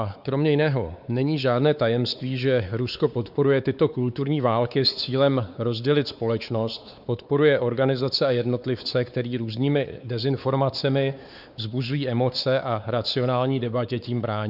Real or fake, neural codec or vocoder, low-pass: fake; codec, 16 kHz, 4 kbps, X-Codec, WavLM features, trained on Multilingual LibriSpeech; 5.4 kHz